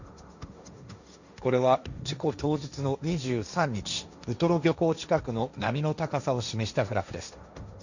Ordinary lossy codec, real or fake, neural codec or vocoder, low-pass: none; fake; codec, 16 kHz, 1.1 kbps, Voila-Tokenizer; 7.2 kHz